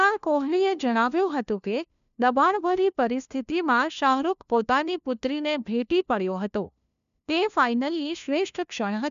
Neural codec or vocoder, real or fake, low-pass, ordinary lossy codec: codec, 16 kHz, 1 kbps, FunCodec, trained on LibriTTS, 50 frames a second; fake; 7.2 kHz; none